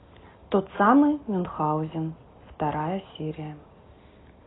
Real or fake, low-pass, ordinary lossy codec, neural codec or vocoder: real; 7.2 kHz; AAC, 16 kbps; none